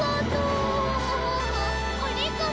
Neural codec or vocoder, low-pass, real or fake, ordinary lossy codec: none; none; real; none